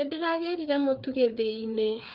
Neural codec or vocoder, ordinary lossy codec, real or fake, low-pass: codec, 16 kHz, 4 kbps, FreqCodec, larger model; Opus, 24 kbps; fake; 5.4 kHz